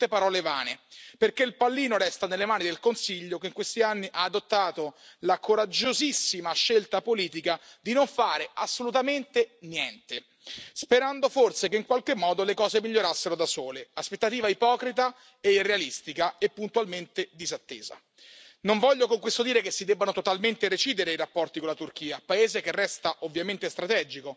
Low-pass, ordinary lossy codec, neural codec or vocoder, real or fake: none; none; none; real